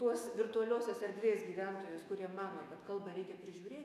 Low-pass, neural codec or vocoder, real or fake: 14.4 kHz; autoencoder, 48 kHz, 128 numbers a frame, DAC-VAE, trained on Japanese speech; fake